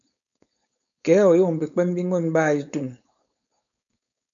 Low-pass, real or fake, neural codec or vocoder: 7.2 kHz; fake; codec, 16 kHz, 4.8 kbps, FACodec